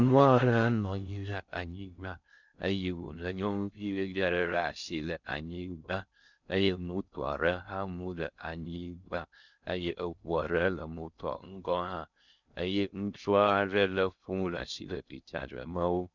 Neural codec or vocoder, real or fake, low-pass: codec, 16 kHz in and 24 kHz out, 0.6 kbps, FocalCodec, streaming, 2048 codes; fake; 7.2 kHz